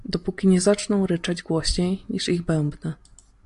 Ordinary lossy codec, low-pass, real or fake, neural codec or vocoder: AAC, 64 kbps; 10.8 kHz; real; none